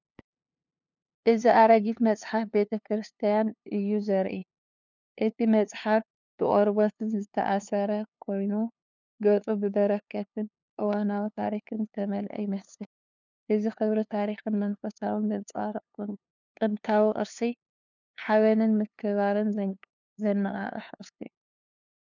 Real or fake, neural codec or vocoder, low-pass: fake; codec, 16 kHz, 2 kbps, FunCodec, trained on LibriTTS, 25 frames a second; 7.2 kHz